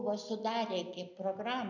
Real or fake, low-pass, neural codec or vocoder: real; 7.2 kHz; none